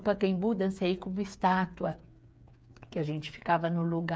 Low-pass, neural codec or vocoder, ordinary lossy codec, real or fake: none; codec, 16 kHz, 8 kbps, FreqCodec, smaller model; none; fake